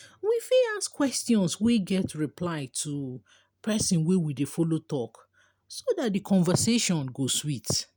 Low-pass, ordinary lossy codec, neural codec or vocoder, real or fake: none; none; none; real